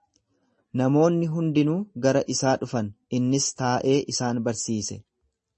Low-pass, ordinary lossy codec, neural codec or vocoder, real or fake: 10.8 kHz; MP3, 32 kbps; none; real